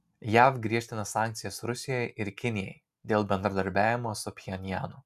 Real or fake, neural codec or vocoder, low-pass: real; none; 14.4 kHz